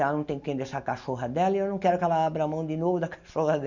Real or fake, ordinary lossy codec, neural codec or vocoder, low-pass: real; none; none; 7.2 kHz